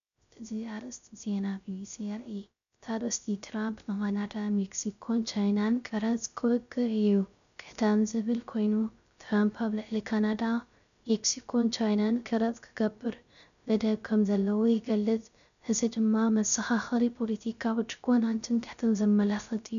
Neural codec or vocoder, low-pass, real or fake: codec, 16 kHz, 0.3 kbps, FocalCodec; 7.2 kHz; fake